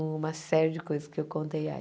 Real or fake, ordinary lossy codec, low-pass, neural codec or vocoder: real; none; none; none